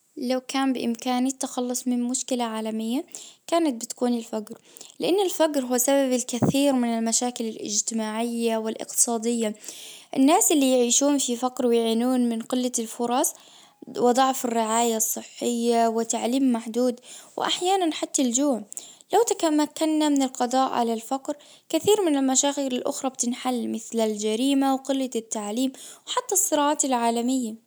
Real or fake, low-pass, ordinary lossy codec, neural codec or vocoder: real; none; none; none